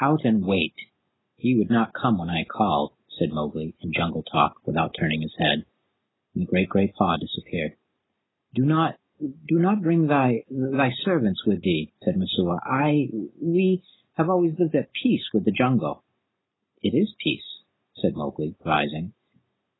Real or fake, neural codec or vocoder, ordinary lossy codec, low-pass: real; none; AAC, 16 kbps; 7.2 kHz